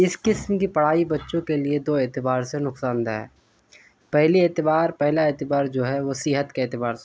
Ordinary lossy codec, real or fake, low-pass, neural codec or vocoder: none; real; none; none